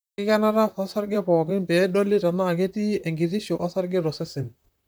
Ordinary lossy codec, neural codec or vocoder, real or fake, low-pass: none; vocoder, 44.1 kHz, 128 mel bands, Pupu-Vocoder; fake; none